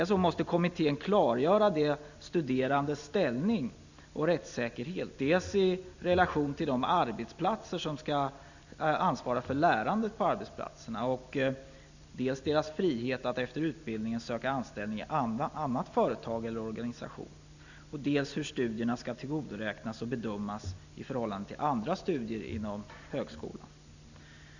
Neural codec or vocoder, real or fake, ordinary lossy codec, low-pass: none; real; none; 7.2 kHz